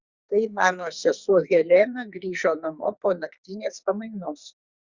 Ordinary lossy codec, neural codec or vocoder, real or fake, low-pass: Opus, 64 kbps; codec, 44.1 kHz, 2.6 kbps, SNAC; fake; 7.2 kHz